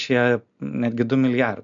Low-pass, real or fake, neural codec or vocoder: 7.2 kHz; real; none